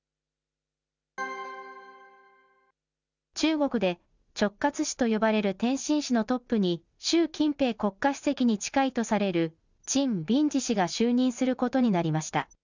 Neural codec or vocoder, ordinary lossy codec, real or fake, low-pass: none; none; real; 7.2 kHz